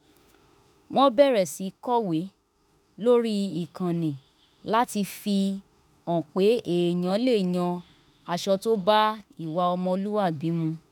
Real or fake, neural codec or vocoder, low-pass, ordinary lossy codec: fake; autoencoder, 48 kHz, 32 numbers a frame, DAC-VAE, trained on Japanese speech; none; none